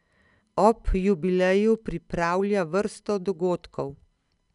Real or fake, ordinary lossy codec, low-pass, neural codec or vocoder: real; none; 10.8 kHz; none